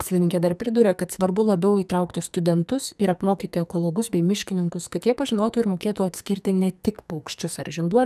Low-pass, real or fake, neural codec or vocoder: 14.4 kHz; fake; codec, 44.1 kHz, 2.6 kbps, SNAC